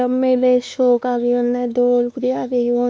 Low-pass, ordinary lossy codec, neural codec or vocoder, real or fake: none; none; codec, 16 kHz, 2 kbps, X-Codec, WavLM features, trained on Multilingual LibriSpeech; fake